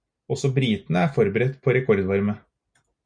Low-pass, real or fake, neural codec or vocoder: 9.9 kHz; real; none